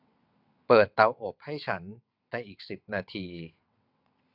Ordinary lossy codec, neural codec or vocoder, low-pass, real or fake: none; vocoder, 22.05 kHz, 80 mel bands, WaveNeXt; 5.4 kHz; fake